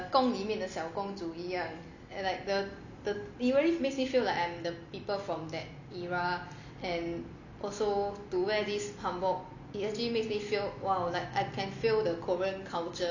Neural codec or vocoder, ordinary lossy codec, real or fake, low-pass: none; none; real; 7.2 kHz